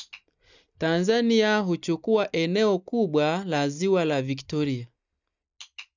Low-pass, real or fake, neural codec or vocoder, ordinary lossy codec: 7.2 kHz; real; none; none